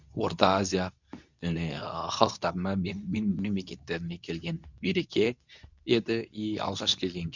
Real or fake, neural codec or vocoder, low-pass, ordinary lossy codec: fake; codec, 24 kHz, 0.9 kbps, WavTokenizer, medium speech release version 2; 7.2 kHz; none